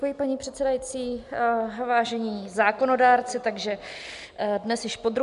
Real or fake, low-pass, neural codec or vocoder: real; 10.8 kHz; none